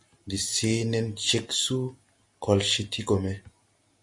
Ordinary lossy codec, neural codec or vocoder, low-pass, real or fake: MP3, 48 kbps; none; 10.8 kHz; real